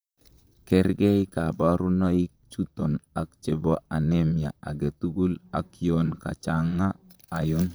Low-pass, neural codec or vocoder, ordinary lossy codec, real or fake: none; vocoder, 44.1 kHz, 128 mel bands, Pupu-Vocoder; none; fake